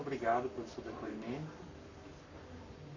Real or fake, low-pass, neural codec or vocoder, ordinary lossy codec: fake; 7.2 kHz; codec, 44.1 kHz, 7.8 kbps, Pupu-Codec; none